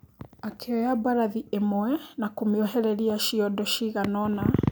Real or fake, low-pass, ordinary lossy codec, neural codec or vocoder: real; none; none; none